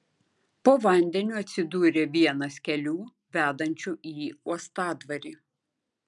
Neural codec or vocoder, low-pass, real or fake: none; 10.8 kHz; real